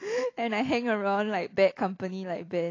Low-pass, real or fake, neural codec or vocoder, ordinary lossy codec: 7.2 kHz; real; none; AAC, 32 kbps